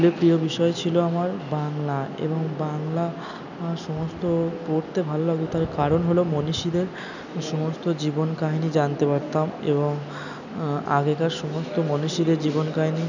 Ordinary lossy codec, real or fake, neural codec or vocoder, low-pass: none; real; none; 7.2 kHz